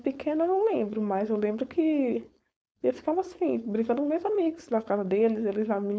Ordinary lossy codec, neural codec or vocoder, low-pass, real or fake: none; codec, 16 kHz, 4.8 kbps, FACodec; none; fake